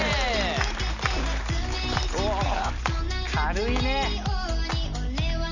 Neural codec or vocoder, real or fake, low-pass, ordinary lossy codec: none; real; 7.2 kHz; none